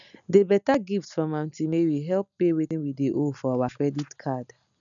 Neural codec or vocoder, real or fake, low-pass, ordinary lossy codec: none; real; 7.2 kHz; none